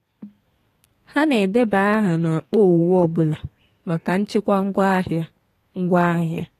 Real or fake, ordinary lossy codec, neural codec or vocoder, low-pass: fake; AAC, 48 kbps; codec, 32 kHz, 1.9 kbps, SNAC; 14.4 kHz